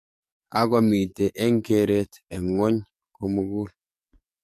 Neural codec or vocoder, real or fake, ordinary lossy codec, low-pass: codec, 44.1 kHz, 7.8 kbps, DAC; fake; MP3, 64 kbps; 14.4 kHz